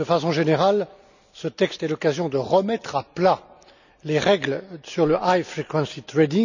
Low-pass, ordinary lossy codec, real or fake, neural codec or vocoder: 7.2 kHz; none; real; none